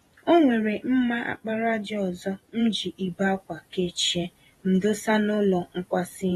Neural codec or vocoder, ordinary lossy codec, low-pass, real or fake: vocoder, 48 kHz, 128 mel bands, Vocos; AAC, 32 kbps; 19.8 kHz; fake